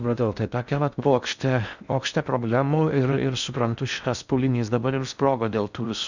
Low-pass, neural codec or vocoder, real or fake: 7.2 kHz; codec, 16 kHz in and 24 kHz out, 0.6 kbps, FocalCodec, streaming, 4096 codes; fake